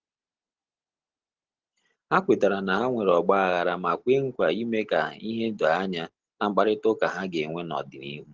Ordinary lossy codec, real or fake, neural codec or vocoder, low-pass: Opus, 16 kbps; real; none; 7.2 kHz